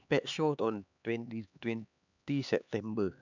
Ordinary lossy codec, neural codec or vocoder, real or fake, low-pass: none; codec, 16 kHz, 2 kbps, X-Codec, HuBERT features, trained on LibriSpeech; fake; 7.2 kHz